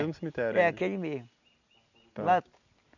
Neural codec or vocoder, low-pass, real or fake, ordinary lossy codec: none; 7.2 kHz; real; none